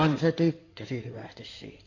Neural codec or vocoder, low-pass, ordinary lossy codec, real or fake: codec, 16 kHz in and 24 kHz out, 2.2 kbps, FireRedTTS-2 codec; 7.2 kHz; AAC, 48 kbps; fake